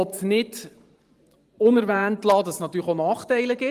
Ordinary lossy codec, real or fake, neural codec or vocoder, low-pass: Opus, 24 kbps; fake; vocoder, 44.1 kHz, 128 mel bands every 512 samples, BigVGAN v2; 14.4 kHz